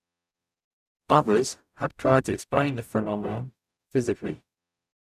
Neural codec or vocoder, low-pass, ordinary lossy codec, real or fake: codec, 44.1 kHz, 0.9 kbps, DAC; 14.4 kHz; none; fake